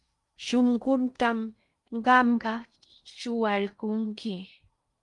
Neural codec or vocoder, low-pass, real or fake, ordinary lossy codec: codec, 16 kHz in and 24 kHz out, 0.6 kbps, FocalCodec, streaming, 2048 codes; 10.8 kHz; fake; Opus, 32 kbps